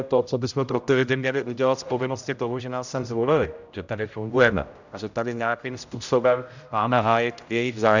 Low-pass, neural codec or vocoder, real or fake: 7.2 kHz; codec, 16 kHz, 0.5 kbps, X-Codec, HuBERT features, trained on general audio; fake